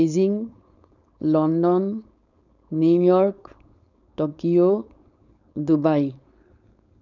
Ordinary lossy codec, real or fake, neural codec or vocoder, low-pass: none; fake; codec, 16 kHz, 4.8 kbps, FACodec; 7.2 kHz